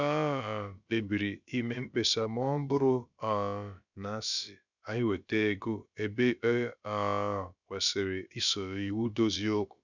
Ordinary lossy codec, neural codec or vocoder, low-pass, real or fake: none; codec, 16 kHz, about 1 kbps, DyCAST, with the encoder's durations; 7.2 kHz; fake